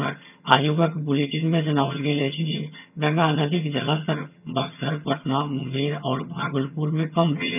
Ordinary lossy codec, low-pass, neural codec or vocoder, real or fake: none; 3.6 kHz; vocoder, 22.05 kHz, 80 mel bands, HiFi-GAN; fake